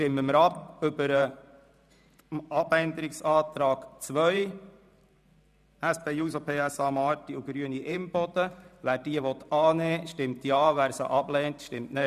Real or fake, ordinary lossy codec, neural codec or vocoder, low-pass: fake; none; vocoder, 44.1 kHz, 128 mel bands every 512 samples, BigVGAN v2; 14.4 kHz